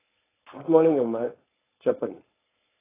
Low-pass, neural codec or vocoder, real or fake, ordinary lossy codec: 3.6 kHz; codec, 16 kHz, 4.8 kbps, FACodec; fake; AAC, 16 kbps